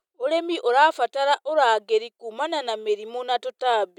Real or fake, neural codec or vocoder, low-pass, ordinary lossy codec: real; none; 19.8 kHz; none